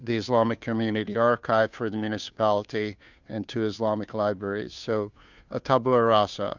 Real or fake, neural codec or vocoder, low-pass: fake; codec, 16 kHz, 2 kbps, FunCodec, trained on Chinese and English, 25 frames a second; 7.2 kHz